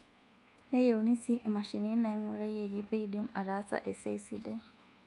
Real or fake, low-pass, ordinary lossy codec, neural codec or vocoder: fake; 10.8 kHz; none; codec, 24 kHz, 1.2 kbps, DualCodec